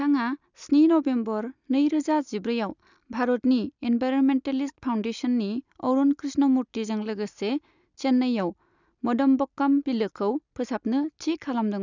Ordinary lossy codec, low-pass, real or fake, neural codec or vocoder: none; 7.2 kHz; real; none